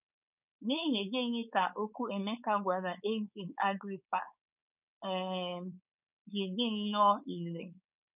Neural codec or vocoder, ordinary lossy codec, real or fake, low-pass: codec, 16 kHz, 4.8 kbps, FACodec; none; fake; 3.6 kHz